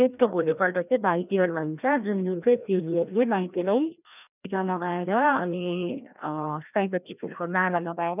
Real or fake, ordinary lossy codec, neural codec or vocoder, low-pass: fake; none; codec, 16 kHz, 1 kbps, FreqCodec, larger model; 3.6 kHz